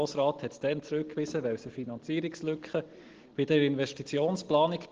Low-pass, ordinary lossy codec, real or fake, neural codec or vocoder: 7.2 kHz; Opus, 16 kbps; real; none